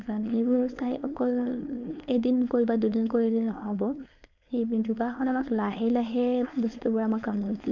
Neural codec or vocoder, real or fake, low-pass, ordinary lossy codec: codec, 16 kHz, 4.8 kbps, FACodec; fake; 7.2 kHz; none